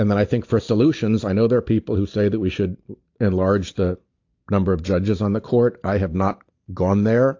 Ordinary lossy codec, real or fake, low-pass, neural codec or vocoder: AAC, 48 kbps; real; 7.2 kHz; none